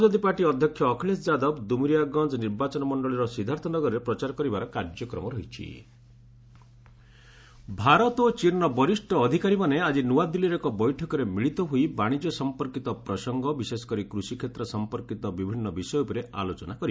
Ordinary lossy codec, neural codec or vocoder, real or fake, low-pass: none; none; real; none